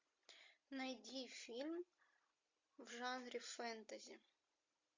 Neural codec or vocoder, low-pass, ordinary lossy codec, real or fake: none; 7.2 kHz; MP3, 48 kbps; real